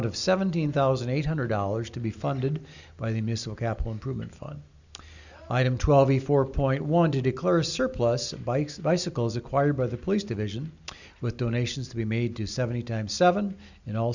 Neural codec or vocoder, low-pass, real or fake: none; 7.2 kHz; real